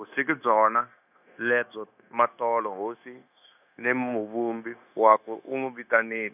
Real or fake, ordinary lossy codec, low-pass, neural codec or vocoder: fake; none; 3.6 kHz; codec, 16 kHz, 0.9 kbps, LongCat-Audio-Codec